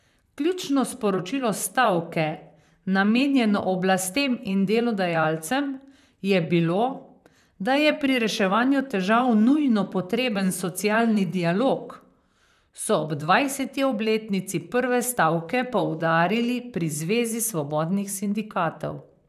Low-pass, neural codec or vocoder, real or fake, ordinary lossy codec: 14.4 kHz; vocoder, 44.1 kHz, 128 mel bands, Pupu-Vocoder; fake; none